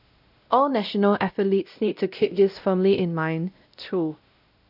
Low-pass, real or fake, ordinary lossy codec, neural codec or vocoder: 5.4 kHz; fake; none; codec, 16 kHz, 0.5 kbps, X-Codec, WavLM features, trained on Multilingual LibriSpeech